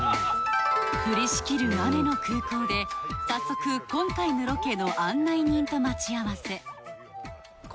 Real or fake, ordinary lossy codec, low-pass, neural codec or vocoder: real; none; none; none